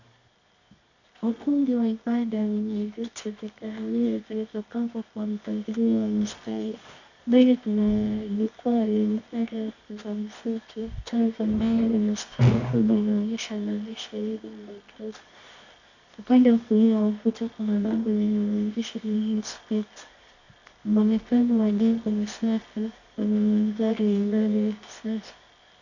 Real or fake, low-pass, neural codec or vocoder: fake; 7.2 kHz; codec, 24 kHz, 0.9 kbps, WavTokenizer, medium music audio release